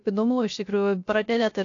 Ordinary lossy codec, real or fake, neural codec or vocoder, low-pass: AAC, 48 kbps; fake; codec, 16 kHz, 0.3 kbps, FocalCodec; 7.2 kHz